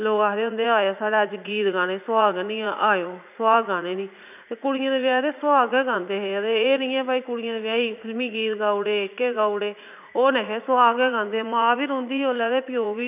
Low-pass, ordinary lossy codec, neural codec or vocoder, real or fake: 3.6 kHz; none; vocoder, 44.1 kHz, 80 mel bands, Vocos; fake